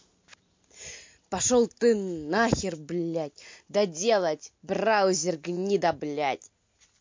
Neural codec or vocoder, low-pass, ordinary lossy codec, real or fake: none; 7.2 kHz; MP3, 48 kbps; real